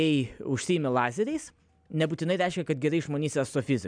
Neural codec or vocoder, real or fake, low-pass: none; real; 9.9 kHz